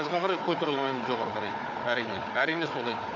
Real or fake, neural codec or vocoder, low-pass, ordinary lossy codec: fake; codec, 16 kHz, 4 kbps, FunCodec, trained on Chinese and English, 50 frames a second; 7.2 kHz; none